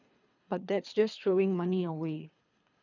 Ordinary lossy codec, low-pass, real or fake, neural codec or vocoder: none; 7.2 kHz; fake; codec, 24 kHz, 3 kbps, HILCodec